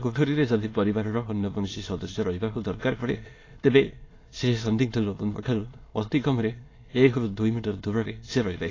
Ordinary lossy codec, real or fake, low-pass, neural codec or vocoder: AAC, 32 kbps; fake; 7.2 kHz; autoencoder, 22.05 kHz, a latent of 192 numbers a frame, VITS, trained on many speakers